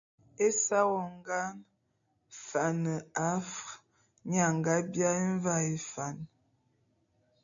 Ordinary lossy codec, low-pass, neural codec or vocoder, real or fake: MP3, 64 kbps; 7.2 kHz; none; real